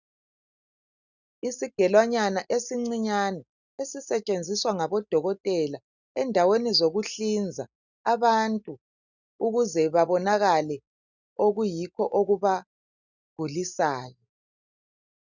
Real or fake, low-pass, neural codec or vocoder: real; 7.2 kHz; none